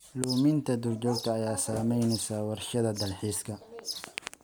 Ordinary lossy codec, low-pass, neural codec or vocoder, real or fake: none; none; none; real